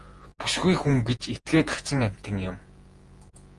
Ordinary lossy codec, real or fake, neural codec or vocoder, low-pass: Opus, 24 kbps; fake; vocoder, 48 kHz, 128 mel bands, Vocos; 10.8 kHz